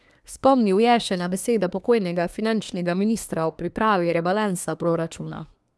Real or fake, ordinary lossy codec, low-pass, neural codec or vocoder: fake; none; none; codec, 24 kHz, 1 kbps, SNAC